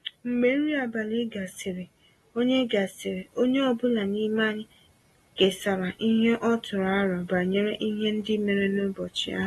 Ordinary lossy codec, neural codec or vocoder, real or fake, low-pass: AAC, 32 kbps; none; real; 19.8 kHz